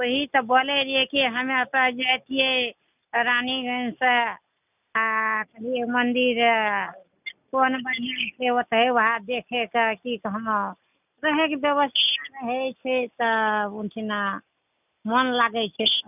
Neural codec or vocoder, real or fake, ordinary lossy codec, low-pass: none; real; none; 3.6 kHz